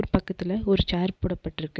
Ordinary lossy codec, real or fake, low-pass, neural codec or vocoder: none; real; none; none